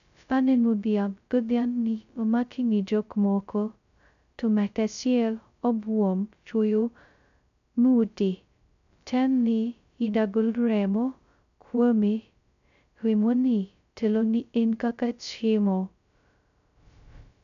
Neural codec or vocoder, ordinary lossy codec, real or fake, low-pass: codec, 16 kHz, 0.2 kbps, FocalCodec; none; fake; 7.2 kHz